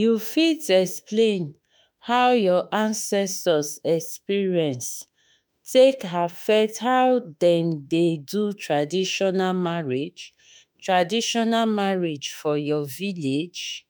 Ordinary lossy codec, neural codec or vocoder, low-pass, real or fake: none; autoencoder, 48 kHz, 32 numbers a frame, DAC-VAE, trained on Japanese speech; none; fake